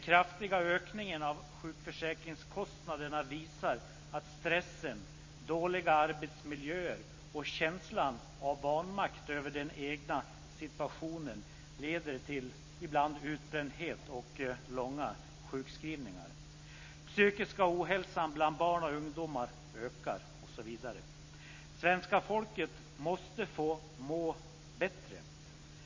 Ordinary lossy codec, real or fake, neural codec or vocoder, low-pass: MP3, 32 kbps; real; none; 7.2 kHz